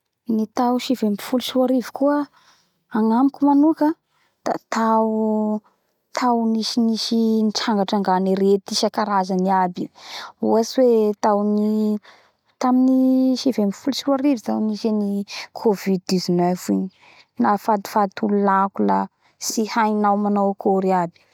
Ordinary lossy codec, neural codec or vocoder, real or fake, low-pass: none; none; real; 19.8 kHz